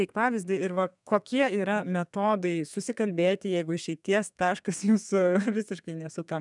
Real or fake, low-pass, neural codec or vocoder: fake; 10.8 kHz; codec, 32 kHz, 1.9 kbps, SNAC